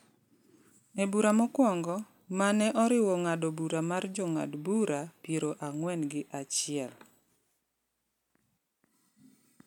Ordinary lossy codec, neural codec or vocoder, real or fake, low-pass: none; none; real; 19.8 kHz